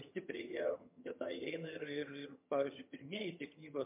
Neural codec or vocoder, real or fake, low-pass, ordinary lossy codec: vocoder, 22.05 kHz, 80 mel bands, HiFi-GAN; fake; 3.6 kHz; MP3, 32 kbps